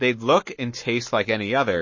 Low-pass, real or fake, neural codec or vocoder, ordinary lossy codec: 7.2 kHz; fake; codec, 44.1 kHz, 7.8 kbps, Pupu-Codec; MP3, 32 kbps